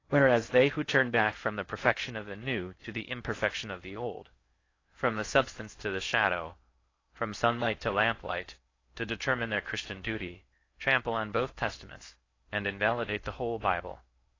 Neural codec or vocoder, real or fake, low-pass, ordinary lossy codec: codec, 16 kHz in and 24 kHz out, 0.6 kbps, FocalCodec, streaming, 4096 codes; fake; 7.2 kHz; AAC, 32 kbps